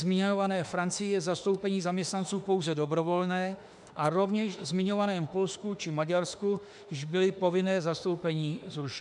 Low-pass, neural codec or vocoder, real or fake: 10.8 kHz; autoencoder, 48 kHz, 32 numbers a frame, DAC-VAE, trained on Japanese speech; fake